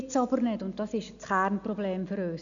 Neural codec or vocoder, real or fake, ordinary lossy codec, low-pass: none; real; none; 7.2 kHz